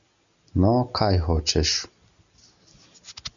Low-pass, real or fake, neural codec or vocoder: 7.2 kHz; real; none